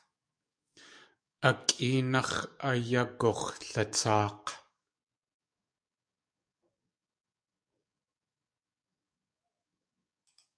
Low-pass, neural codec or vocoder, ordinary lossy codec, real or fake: 9.9 kHz; codec, 44.1 kHz, 7.8 kbps, DAC; MP3, 64 kbps; fake